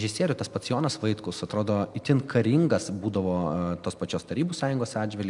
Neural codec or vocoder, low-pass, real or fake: none; 10.8 kHz; real